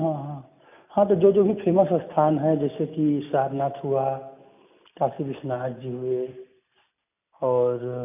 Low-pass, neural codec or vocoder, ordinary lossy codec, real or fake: 3.6 kHz; none; none; real